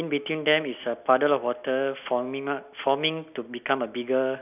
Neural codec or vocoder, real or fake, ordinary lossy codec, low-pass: none; real; none; 3.6 kHz